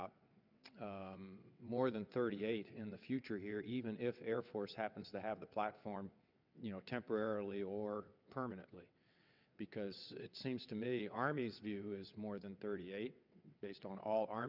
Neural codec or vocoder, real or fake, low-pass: vocoder, 22.05 kHz, 80 mel bands, WaveNeXt; fake; 5.4 kHz